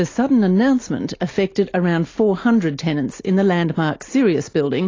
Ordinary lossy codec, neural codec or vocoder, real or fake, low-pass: AAC, 32 kbps; none; real; 7.2 kHz